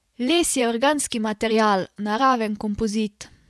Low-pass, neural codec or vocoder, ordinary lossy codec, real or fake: none; vocoder, 24 kHz, 100 mel bands, Vocos; none; fake